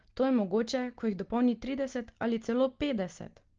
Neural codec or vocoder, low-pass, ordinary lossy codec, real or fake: none; 7.2 kHz; Opus, 16 kbps; real